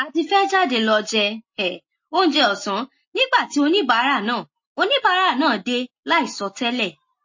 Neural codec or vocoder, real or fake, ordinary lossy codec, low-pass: none; real; MP3, 32 kbps; 7.2 kHz